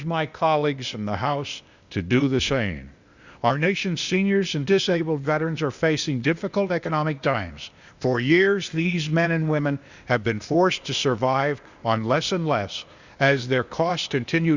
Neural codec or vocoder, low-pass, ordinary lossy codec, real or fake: codec, 16 kHz, 0.8 kbps, ZipCodec; 7.2 kHz; Opus, 64 kbps; fake